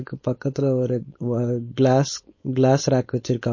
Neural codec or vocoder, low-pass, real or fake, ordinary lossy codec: codec, 16 kHz, 4.8 kbps, FACodec; 7.2 kHz; fake; MP3, 32 kbps